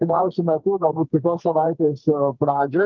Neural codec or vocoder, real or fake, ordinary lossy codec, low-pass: codec, 44.1 kHz, 2.6 kbps, SNAC; fake; Opus, 32 kbps; 7.2 kHz